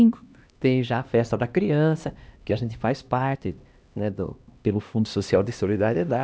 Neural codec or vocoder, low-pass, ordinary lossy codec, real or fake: codec, 16 kHz, 1 kbps, X-Codec, HuBERT features, trained on LibriSpeech; none; none; fake